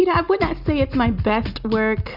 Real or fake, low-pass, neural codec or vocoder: real; 5.4 kHz; none